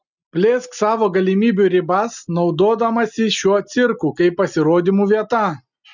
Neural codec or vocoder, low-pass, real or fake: none; 7.2 kHz; real